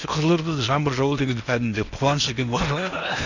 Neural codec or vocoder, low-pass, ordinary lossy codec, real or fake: codec, 16 kHz in and 24 kHz out, 0.8 kbps, FocalCodec, streaming, 65536 codes; 7.2 kHz; none; fake